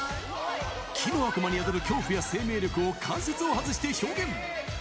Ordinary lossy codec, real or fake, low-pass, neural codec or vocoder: none; real; none; none